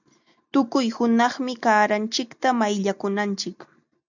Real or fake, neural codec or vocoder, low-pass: real; none; 7.2 kHz